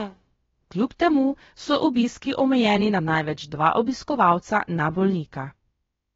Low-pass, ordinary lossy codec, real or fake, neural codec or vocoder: 7.2 kHz; AAC, 24 kbps; fake; codec, 16 kHz, about 1 kbps, DyCAST, with the encoder's durations